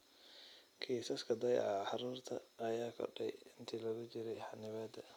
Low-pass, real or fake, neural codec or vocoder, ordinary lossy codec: 19.8 kHz; real; none; none